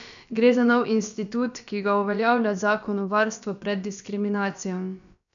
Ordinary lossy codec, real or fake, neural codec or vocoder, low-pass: none; fake; codec, 16 kHz, about 1 kbps, DyCAST, with the encoder's durations; 7.2 kHz